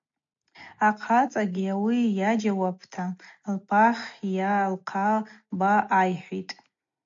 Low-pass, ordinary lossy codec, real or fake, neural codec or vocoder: 7.2 kHz; MP3, 48 kbps; real; none